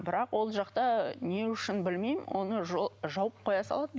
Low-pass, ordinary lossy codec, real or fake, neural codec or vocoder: none; none; real; none